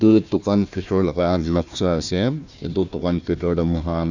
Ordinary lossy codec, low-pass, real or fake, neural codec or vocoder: none; 7.2 kHz; fake; codec, 16 kHz, 1 kbps, FunCodec, trained on Chinese and English, 50 frames a second